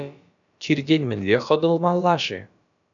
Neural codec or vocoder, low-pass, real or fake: codec, 16 kHz, about 1 kbps, DyCAST, with the encoder's durations; 7.2 kHz; fake